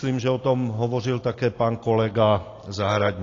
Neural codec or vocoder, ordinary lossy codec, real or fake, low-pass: none; AAC, 32 kbps; real; 7.2 kHz